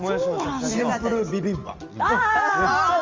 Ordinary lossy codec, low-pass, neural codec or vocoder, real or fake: Opus, 32 kbps; 7.2 kHz; none; real